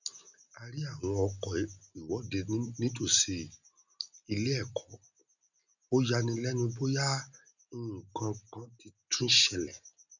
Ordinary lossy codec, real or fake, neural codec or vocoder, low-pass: none; real; none; 7.2 kHz